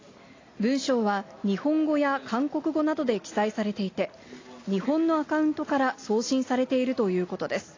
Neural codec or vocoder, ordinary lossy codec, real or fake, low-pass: none; AAC, 32 kbps; real; 7.2 kHz